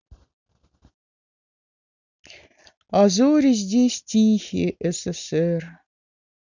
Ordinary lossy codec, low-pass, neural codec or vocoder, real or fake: none; 7.2 kHz; none; real